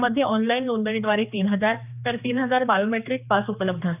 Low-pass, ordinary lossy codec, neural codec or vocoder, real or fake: 3.6 kHz; none; codec, 16 kHz, 2 kbps, X-Codec, HuBERT features, trained on general audio; fake